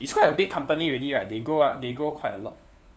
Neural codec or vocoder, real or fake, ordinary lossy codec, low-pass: codec, 16 kHz, 4 kbps, FunCodec, trained on LibriTTS, 50 frames a second; fake; none; none